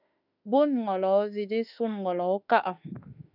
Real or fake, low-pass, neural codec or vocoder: fake; 5.4 kHz; autoencoder, 48 kHz, 32 numbers a frame, DAC-VAE, trained on Japanese speech